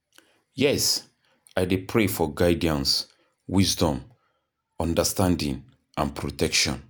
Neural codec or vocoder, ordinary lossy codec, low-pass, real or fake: none; none; none; real